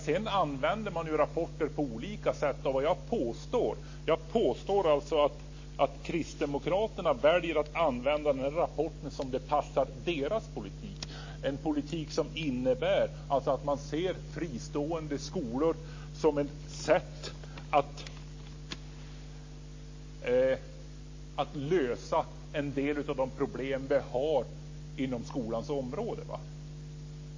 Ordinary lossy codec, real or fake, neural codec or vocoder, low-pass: MP3, 32 kbps; real; none; 7.2 kHz